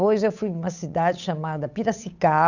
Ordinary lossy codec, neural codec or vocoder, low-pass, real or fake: none; codec, 16 kHz, 8 kbps, FunCodec, trained on Chinese and English, 25 frames a second; 7.2 kHz; fake